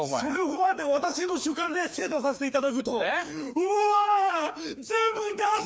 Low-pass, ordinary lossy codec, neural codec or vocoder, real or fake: none; none; codec, 16 kHz, 2 kbps, FreqCodec, larger model; fake